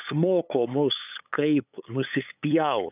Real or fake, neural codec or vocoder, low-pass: fake; codec, 16 kHz, 8 kbps, FunCodec, trained on LibriTTS, 25 frames a second; 3.6 kHz